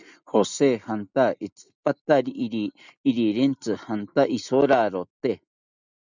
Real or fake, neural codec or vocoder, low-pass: real; none; 7.2 kHz